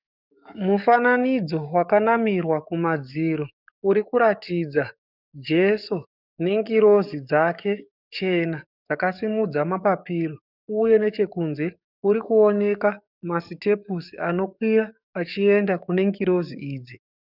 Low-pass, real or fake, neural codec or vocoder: 5.4 kHz; fake; codec, 44.1 kHz, 7.8 kbps, DAC